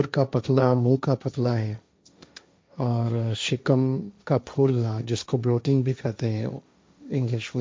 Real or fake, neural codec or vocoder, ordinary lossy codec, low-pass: fake; codec, 16 kHz, 1.1 kbps, Voila-Tokenizer; none; none